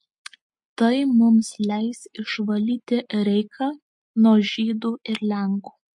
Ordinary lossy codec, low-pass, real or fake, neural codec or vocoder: MP3, 48 kbps; 10.8 kHz; real; none